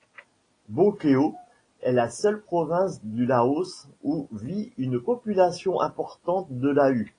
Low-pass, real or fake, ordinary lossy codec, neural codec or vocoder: 9.9 kHz; real; AAC, 32 kbps; none